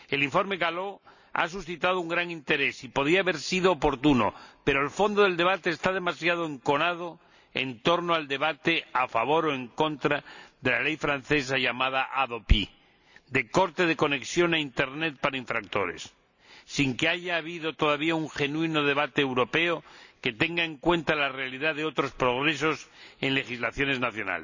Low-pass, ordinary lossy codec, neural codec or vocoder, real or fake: 7.2 kHz; none; none; real